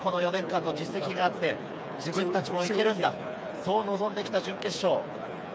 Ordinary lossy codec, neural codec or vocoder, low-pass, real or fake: none; codec, 16 kHz, 4 kbps, FreqCodec, smaller model; none; fake